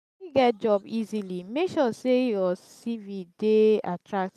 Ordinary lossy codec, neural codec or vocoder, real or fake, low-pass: none; none; real; 14.4 kHz